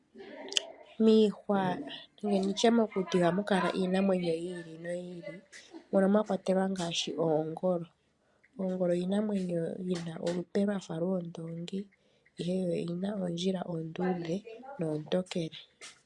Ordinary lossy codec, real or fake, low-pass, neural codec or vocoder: MP3, 64 kbps; real; 10.8 kHz; none